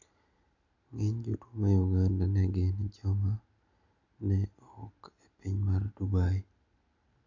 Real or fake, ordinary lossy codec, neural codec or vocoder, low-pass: real; none; none; 7.2 kHz